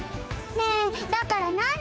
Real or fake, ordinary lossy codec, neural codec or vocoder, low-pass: fake; none; codec, 16 kHz, 4 kbps, X-Codec, HuBERT features, trained on general audio; none